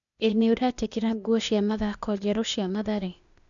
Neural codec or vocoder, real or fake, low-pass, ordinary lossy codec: codec, 16 kHz, 0.8 kbps, ZipCodec; fake; 7.2 kHz; none